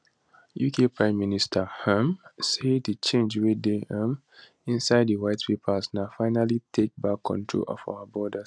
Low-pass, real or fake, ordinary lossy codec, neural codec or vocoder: 9.9 kHz; real; none; none